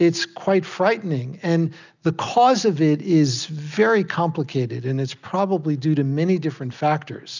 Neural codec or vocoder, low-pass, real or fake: none; 7.2 kHz; real